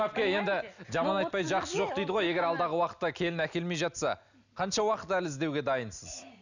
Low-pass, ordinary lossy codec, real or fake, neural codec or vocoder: 7.2 kHz; none; real; none